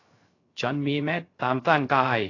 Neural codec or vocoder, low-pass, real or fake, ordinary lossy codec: codec, 16 kHz, 0.3 kbps, FocalCodec; 7.2 kHz; fake; AAC, 32 kbps